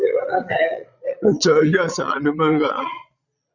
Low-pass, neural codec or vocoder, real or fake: 7.2 kHz; vocoder, 22.05 kHz, 80 mel bands, Vocos; fake